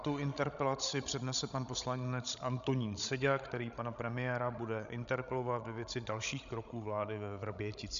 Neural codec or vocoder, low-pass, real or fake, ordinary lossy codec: codec, 16 kHz, 16 kbps, FreqCodec, larger model; 7.2 kHz; fake; MP3, 96 kbps